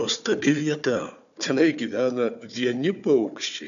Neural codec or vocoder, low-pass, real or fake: codec, 16 kHz, 4 kbps, FunCodec, trained on Chinese and English, 50 frames a second; 7.2 kHz; fake